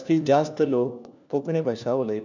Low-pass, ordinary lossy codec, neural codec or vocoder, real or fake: 7.2 kHz; none; codec, 16 kHz, 1 kbps, FunCodec, trained on LibriTTS, 50 frames a second; fake